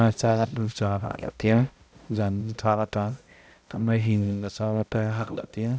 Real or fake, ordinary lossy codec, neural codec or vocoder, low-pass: fake; none; codec, 16 kHz, 0.5 kbps, X-Codec, HuBERT features, trained on balanced general audio; none